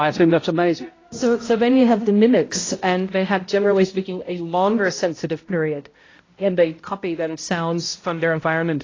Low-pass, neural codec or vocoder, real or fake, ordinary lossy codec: 7.2 kHz; codec, 16 kHz, 0.5 kbps, X-Codec, HuBERT features, trained on balanced general audio; fake; AAC, 32 kbps